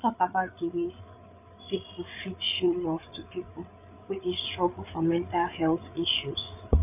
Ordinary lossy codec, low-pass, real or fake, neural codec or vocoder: none; 3.6 kHz; fake; codec, 16 kHz, 8 kbps, FreqCodec, larger model